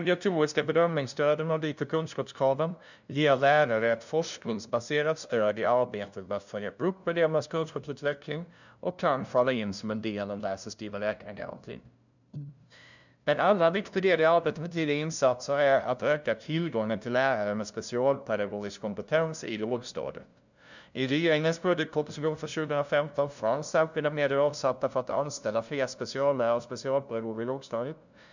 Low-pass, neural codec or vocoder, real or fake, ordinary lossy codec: 7.2 kHz; codec, 16 kHz, 0.5 kbps, FunCodec, trained on LibriTTS, 25 frames a second; fake; none